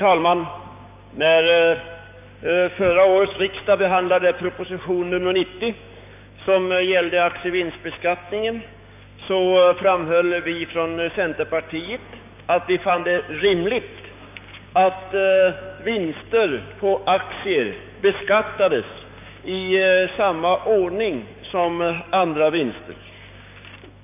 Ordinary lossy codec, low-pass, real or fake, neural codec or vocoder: none; 3.6 kHz; fake; codec, 16 kHz, 6 kbps, DAC